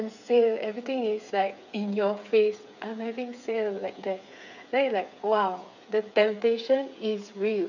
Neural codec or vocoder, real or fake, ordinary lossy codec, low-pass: codec, 16 kHz, 8 kbps, FreqCodec, smaller model; fake; none; 7.2 kHz